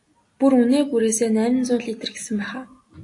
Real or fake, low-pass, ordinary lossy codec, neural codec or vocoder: real; 10.8 kHz; AAC, 48 kbps; none